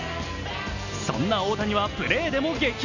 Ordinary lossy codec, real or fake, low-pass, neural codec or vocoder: none; real; 7.2 kHz; none